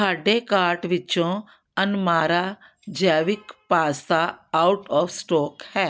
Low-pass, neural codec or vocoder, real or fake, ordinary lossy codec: none; none; real; none